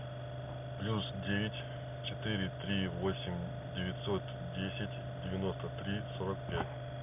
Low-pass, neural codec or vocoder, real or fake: 3.6 kHz; none; real